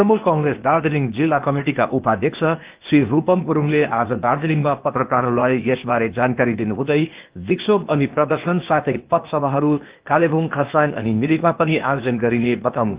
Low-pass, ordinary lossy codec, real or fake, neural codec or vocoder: 3.6 kHz; Opus, 32 kbps; fake; codec, 16 kHz, 0.8 kbps, ZipCodec